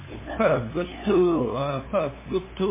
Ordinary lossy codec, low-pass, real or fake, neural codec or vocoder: MP3, 16 kbps; 3.6 kHz; fake; codec, 16 kHz, 2 kbps, FunCodec, trained on LibriTTS, 25 frames a second